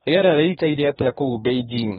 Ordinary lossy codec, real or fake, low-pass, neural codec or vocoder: AAC, 16 kbps; fake; 14.4 kHz; codec, 32 kHz, 1.9 kbps, SNAC